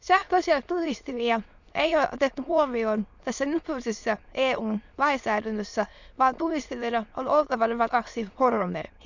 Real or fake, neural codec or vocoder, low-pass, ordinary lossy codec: fake; autoencoder, 22.05 kHz, a latent of 192 numbers a frame, VITS, trained on many speakers; 7.2 kHz; none